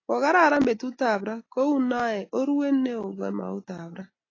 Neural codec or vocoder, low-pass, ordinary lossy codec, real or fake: none; 7.2 kHz; AAC, 32 kbps; real